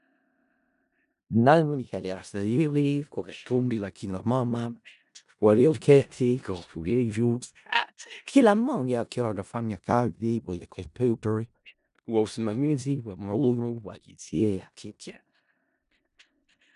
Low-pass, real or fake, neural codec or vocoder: 10.8 kHz; fake; codec, 16 kHz in and 24 kHz out, 0.4 kbps, LongCat-Audio-Codec, four codebook decoder